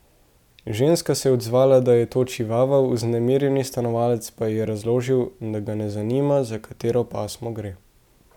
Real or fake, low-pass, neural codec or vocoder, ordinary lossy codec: real; 19.8 kHz; none; none